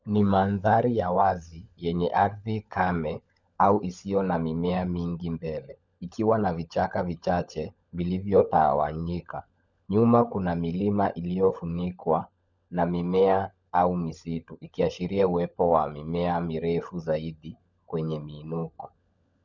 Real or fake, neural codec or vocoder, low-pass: fake; codec, 16 kHz, 16 kbps, FunCodec, trained on LibriTTS, 50 frames a second; 7.2 kHz